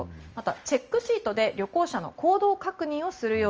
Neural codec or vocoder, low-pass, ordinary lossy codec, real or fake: none; 7.2 kHz; Opus, 24 kbps; real